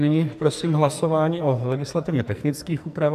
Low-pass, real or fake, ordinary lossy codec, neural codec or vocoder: 14.4 kHz; fake; AAC, 96 kbps; codec, 44.1 kHz, 2.6 kbps, SNAC